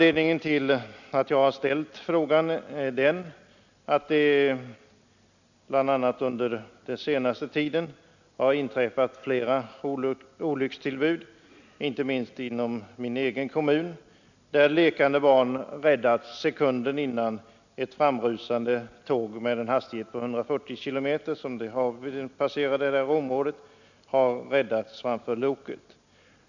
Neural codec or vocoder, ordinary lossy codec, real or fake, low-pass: none; none; real; 7.2 kHz